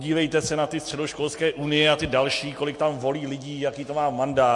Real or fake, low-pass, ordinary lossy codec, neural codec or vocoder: real; 10.8 kHz; MP3, 48 kbps; none